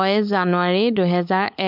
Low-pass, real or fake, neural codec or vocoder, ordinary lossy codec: 5.4 kHz; fake; codec, 16 kHz, 16 kbps, FunCodec, trained on LibriTTS, 50 frames a second; MP3, 48 kbps